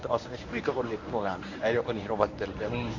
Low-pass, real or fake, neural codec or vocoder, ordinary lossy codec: 7.2 kHz; fake; codec, 24 kHz, 0.9 kbps, WavTokenizer, medium speech release version 1; AAC, 48 kbps